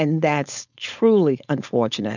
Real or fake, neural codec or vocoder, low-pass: fake; codec, 16 kHz, 4.8 kbps, FACodec; 7.2 kHz